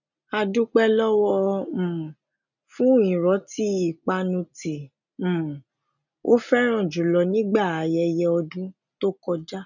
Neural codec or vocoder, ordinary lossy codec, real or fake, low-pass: none; none; real; 7.2 kHz